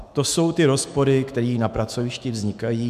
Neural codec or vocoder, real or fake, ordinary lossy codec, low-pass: autoencoder, 48 kHz, 128 numbers a frame, DAC-VAE, trained on Japanese speech; fake; MP3, 96 kbps; 14.4 kHz